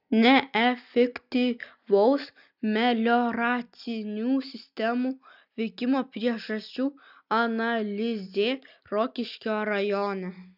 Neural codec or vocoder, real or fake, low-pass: none; real; 5.4 kHz